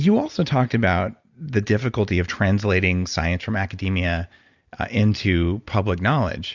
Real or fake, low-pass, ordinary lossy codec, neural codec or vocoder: real; 7.2 kHz; Opus, 64 kbps; none